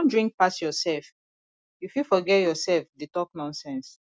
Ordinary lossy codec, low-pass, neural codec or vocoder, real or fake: none; none; none; real